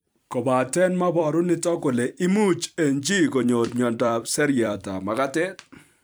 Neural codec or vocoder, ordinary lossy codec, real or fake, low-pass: vocoder, 44.1 kHz, 128 mel bands every 256 samples, BigVGAN v2; none; fake; none